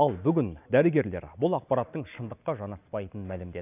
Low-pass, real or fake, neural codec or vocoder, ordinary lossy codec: 3.6 kHz; real; none; none